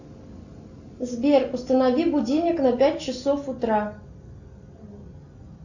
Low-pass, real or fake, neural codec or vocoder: 7.2 kHz; real; none